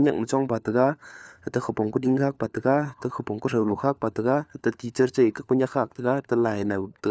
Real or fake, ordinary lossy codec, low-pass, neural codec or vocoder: fake; none; none; codec, 16 kHz, 4 kbps, FunCodec, trained on LibriTTS, 50 frames a second